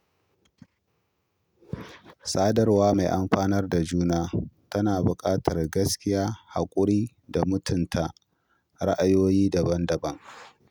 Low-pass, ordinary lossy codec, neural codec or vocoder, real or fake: none; none; none; real